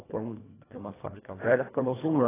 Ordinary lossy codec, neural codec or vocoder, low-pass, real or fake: AAC, 16 kbps; codec, 24 kHz, 1.5 kbps, HILCodec; 3.6 kHz; fake